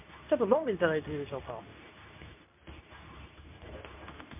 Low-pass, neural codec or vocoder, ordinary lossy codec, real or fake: 3.6 kHz; codec, 24 kHz, 0.9 kbps, WavTokenizer, medium speech release version 2; MP3, 32 kbps; fake